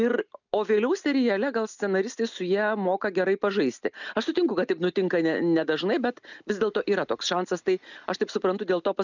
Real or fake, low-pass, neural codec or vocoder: real; 7.2 kHz; none